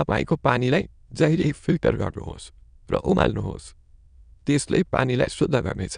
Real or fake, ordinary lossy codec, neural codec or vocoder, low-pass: fake; none; autoencoder, 22.05 kHz, a latent of 192 numbers a frame, VITS, trained on many speakers; 9.9 kHz